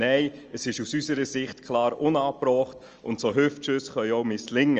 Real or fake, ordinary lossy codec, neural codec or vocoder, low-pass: real; Opus, 24 kbps; none; 7.2 kHz